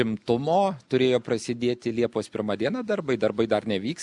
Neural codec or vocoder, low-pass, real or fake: none; 10.8 kHz; real